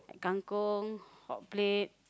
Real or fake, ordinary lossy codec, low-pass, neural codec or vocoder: real; none; none; none